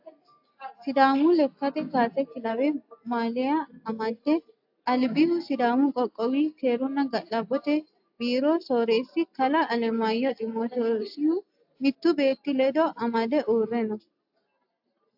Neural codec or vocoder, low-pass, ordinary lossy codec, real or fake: none; 5.4 kHz; AAC, 48 kbps; real